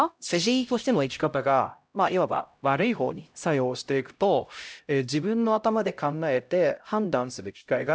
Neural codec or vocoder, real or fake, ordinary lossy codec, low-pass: codec, 16 kHz, 0.5 kbps, X-Codec, HuBERT features, trained on LibriSpeech; fake; none; none